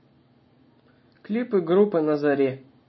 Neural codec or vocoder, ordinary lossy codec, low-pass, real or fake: none; MP3, 24 kbps; 7.2 kHz; real